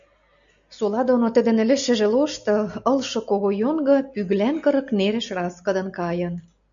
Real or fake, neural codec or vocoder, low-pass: real; none; 7.2 kHz